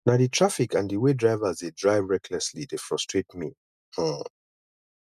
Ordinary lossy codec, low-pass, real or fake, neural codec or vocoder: none; 14.4 kHz; real; none